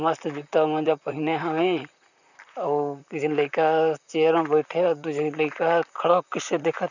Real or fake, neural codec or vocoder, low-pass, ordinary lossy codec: fake; vocoder, 44.1 kHz, 128 mel bands, Pupu-Vocoder; 7.2 kHz; none